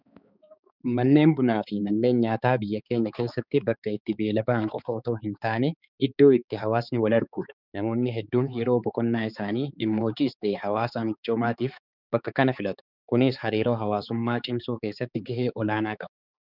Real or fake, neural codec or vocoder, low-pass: fake; codec, 16 kHz, 4 kbps, X-Codec, HuBERT features, trained on balanced general audio; 5.4 kHz